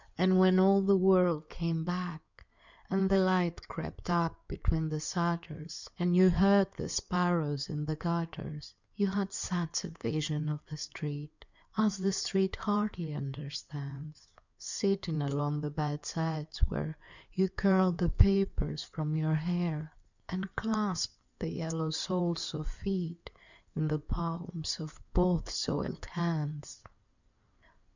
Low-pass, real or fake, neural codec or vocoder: 7.2 kHz; fake; codec, 16 kHz in and 24 kHz out, 2.2 kbps, FireRedTTS-2 codec